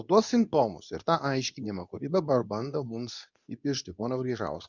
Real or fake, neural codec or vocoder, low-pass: fake; codec, 24 kHz, 0.9 kbps, WavTokenizer, medium speech release version 2; 7.2 kHz